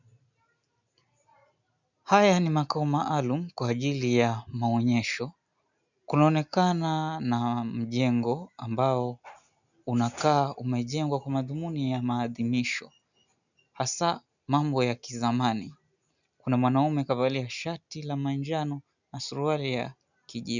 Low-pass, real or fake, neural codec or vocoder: 7.2 kHz; real; none